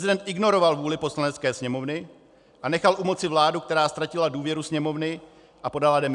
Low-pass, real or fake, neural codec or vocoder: 10.8 kHz; real; none